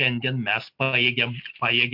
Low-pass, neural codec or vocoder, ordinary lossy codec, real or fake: 5.4 kHz; none; MP3, 48 kbps; real